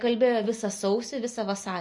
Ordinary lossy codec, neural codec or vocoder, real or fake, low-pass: MP3, 48 kbps; none; real; 10.8 kHz